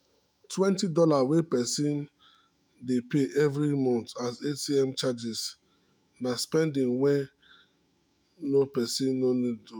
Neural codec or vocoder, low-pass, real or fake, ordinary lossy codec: autoencoder, 48 kHz, 128 numbers a frame, DAC-VAE, trained on Japanese speech; none; fake; none